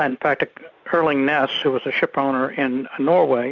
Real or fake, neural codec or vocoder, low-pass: real; none; 7.2 kHz